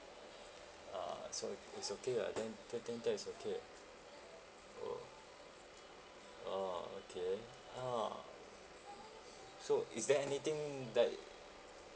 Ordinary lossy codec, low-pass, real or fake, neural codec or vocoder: none; none; real; none